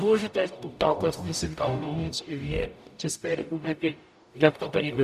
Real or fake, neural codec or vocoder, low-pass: fake; codec, 44.1 kHz, 0.9 kbps, DAC; 14.4 kHz